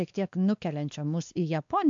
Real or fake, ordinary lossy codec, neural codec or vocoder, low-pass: fake; AAC, 64 kbps; codec, 16 kHz, 2 kbps, X-Codec, WavLM features, trained on Multilingual LibriSpeech; 7.2 kHz